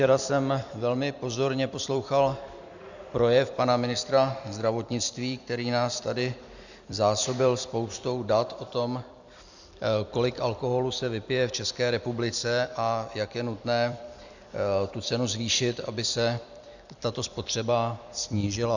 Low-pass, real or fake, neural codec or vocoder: 7.2 kHz; fake; vocoder, 44.1 kHz, 128 mel bands every 256 samples, BigVGAN v2